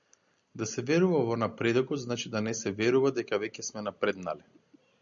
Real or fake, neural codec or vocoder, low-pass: real; none; 7.2 kHz